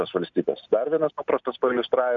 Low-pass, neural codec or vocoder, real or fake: 7.2 kHz; none; real